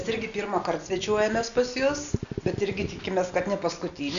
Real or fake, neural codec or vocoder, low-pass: real; none; 7.2 kHz